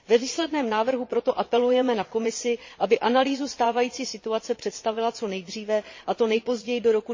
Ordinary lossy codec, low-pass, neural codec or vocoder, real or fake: MP3, 32 kbps; 7.2 kHz; vocoder, 22.05 kHz, 80 mel bands, Vocos; fake